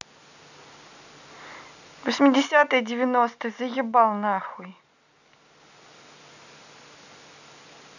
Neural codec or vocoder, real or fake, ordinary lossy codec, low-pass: none; real; none; 7.2 kHz